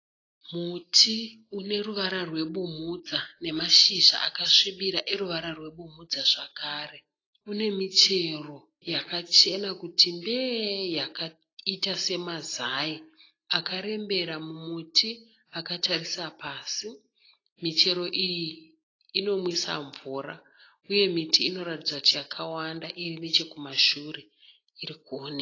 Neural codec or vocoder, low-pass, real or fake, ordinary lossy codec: none; 7.2 kHz; real; AAC, 32 kbps